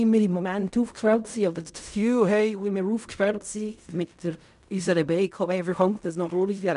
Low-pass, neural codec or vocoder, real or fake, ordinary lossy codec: 10.8 kHz; codec, 16 kHz in and 24 kHz out, 0.4 kbps, LongCat-Audio-Codec, fine tuned four codebook decoder; fake; none